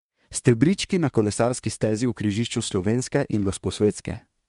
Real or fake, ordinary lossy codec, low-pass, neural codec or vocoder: fake; MP3, 64 kbps; 10.8 kHz; codec, 24 kHz, 1 kbps, SNAC